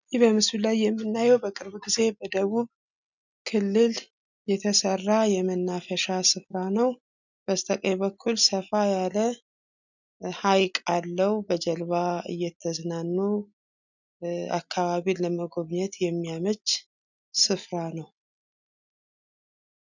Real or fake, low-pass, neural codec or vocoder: real; 7.2 kHz; none